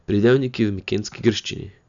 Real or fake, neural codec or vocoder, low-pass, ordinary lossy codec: real; none; 7.2 kHz; none